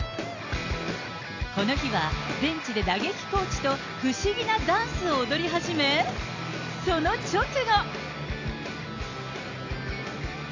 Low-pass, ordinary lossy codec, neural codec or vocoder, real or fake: 7.2 kHz; AAC, 48 kbps; none; real